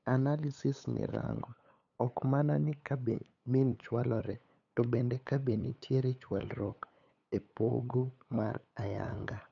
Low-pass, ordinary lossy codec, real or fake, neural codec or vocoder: 7.2 kHz; none; fake; codec, 16 kHz, 8 kbps, FunCodec, trained on LibriTTS, 25 frames a second